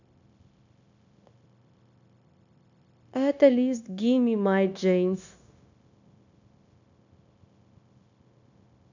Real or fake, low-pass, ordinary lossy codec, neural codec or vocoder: fake; 7.2 kHz; none; codec, 16 kHz, 0.9 kbps, LongCat-Audio-Codec